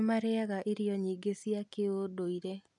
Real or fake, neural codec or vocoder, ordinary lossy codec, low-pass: real; none; none; 10.8 kHz